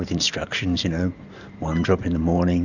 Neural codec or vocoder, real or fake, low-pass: vocoder, 44.1 kHz, 128 mel bands every 512 samples, BigVGAN v2; fake; 7.2 kHz